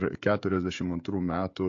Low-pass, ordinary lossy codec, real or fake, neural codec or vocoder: 7.2 kHz; AAC, 48 kbps; fake; codec, 16 kHz, 16 kbps, FreqCodec, smaller model